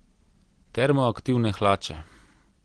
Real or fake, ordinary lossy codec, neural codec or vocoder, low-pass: real; Opus, 16 kbps; none; 9.9 kHz